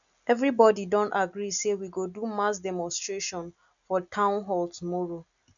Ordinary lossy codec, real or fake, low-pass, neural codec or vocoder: none; real; 7.2 kHz; none